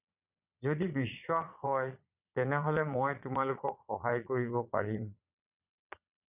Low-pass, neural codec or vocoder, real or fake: 3.6 kHz; vocoder, 22.05 kHz, 80 mel bands, Vocos; fake